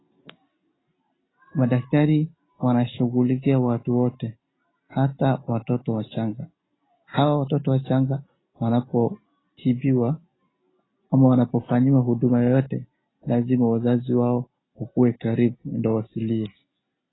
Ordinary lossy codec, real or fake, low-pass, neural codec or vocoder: AAC, 16 kbps; real; 7.2 kHz; none